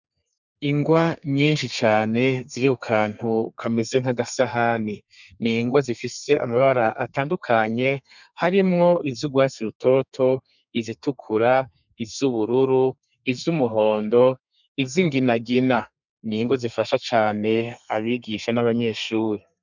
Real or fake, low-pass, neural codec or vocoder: fake; 7.2 kHz; codec, 32 kHz, 1.9 kbps, SNAC